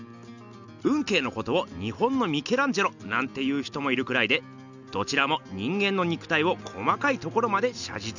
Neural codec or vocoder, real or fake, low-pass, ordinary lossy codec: none; real; 7.2 kHz; none